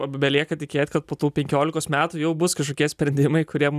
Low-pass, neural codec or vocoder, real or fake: 14.4 kHz; none; real